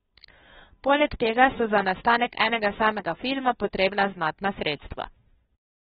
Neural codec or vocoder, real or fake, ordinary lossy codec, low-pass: codec, 16 kHz, 2 kbps, FunCodec, trained on Chinese and English, 25 frames a second; fake; AAC, 16 kbps; 7.2 kHz